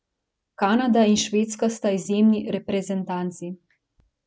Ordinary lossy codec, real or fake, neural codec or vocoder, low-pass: none; real; none; none